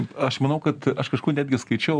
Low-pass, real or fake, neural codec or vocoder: 9.9 kHz; real; none